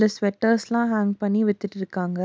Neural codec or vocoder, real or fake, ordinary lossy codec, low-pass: none; real; none; none